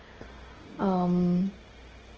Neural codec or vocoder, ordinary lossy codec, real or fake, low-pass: none; Opus, 16 kbps; real; 7.2 kHz